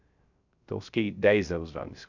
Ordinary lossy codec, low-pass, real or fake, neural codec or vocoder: none; 7.2 kHz; fake; codec, 16 kHz, 0.3 kbps, FocalCodec